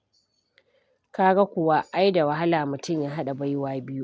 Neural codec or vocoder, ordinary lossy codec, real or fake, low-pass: none; none; real; none